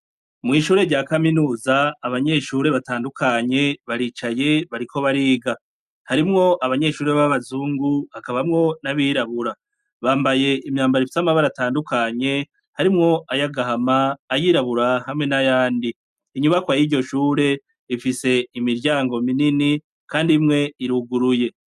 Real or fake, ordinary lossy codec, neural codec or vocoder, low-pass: real; MP3, 96 kbps; none; 14.4 kHz